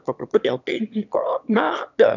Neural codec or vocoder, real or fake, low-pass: autoencoder, 22.05 kHz, a latent of 192 numbers a frame, VITS, trained on one speaker; fake; 7.2 kHz